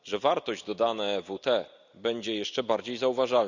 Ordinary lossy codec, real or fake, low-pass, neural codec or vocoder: Opus, 64 kbps; real; 7.2 kHz; none